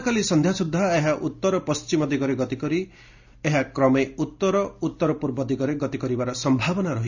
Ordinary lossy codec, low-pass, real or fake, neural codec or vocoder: none; 7.2 kHz; real; none